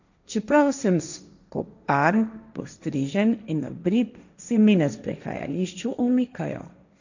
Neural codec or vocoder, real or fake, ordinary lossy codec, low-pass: codec, 16 kHz, 1.1 kbps, Voila-Tokenizer; fake; none; 7.2 kHz